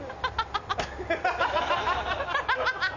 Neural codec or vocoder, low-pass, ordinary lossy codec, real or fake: none; 7.2 kHz; none; real